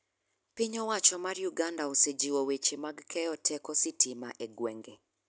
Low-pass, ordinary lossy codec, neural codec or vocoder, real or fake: none; none; none; real